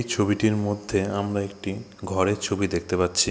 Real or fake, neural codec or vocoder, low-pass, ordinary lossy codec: real; none; none; none